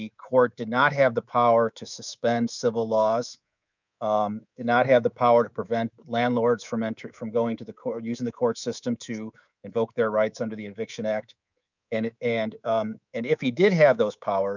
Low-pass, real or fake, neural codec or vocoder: 7.2 kHz; fake; codec, 24 kHz, 3.1 kbps, DualCodec